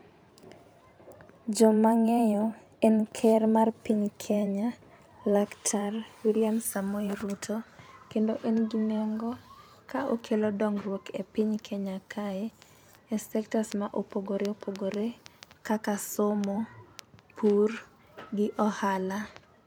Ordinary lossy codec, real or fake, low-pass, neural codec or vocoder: none; fake; none; vocoder, 44.1 kHz, 128 mel bands every 512 samples, BigVGAN v2